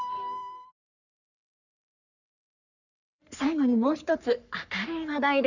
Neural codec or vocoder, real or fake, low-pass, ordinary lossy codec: codec, 44.1 kHz, 3.4 kbps, Pupu-Codec; fake; 7.2 kHz; none